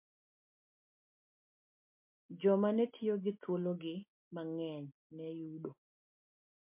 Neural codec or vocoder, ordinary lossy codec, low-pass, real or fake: none; MP3, 32 kbps; 3.6 kHz; real